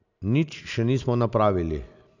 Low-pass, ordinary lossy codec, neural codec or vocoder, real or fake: 7.2 kHz; none; none; real